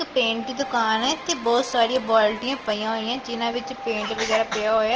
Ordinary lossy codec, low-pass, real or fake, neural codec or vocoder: Opus, 16 kbps; 7.2 kHz; real; none